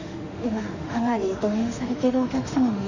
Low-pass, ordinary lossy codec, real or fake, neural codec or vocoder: 7.2 kHz; none; fake; autoencoder, 48 kHz, 32 numbers a frame, DAC-VAE, trained on Japanese speech